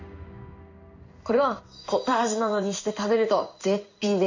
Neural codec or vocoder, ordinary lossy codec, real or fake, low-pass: codec, 16 kHz in and 24 kHz out, 1 kbps, XY-Tokenizer; none; fake; 7.2 kHz